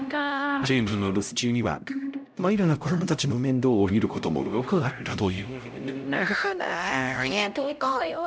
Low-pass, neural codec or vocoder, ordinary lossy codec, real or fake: none; codec, 16 kHz, 0.5 kbps, X-Codec, HuBERT features, trained on LibriSpeech; none; fake